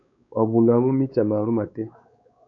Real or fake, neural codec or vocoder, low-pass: fake; codec, 16 kHz, 4 kbps, X-Codec, WavLM features, trained on Multilingual LibriSpeech; 7.2 kHz